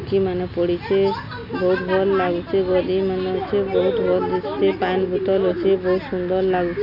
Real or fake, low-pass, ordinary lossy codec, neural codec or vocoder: real; 5.4 kHz; none; none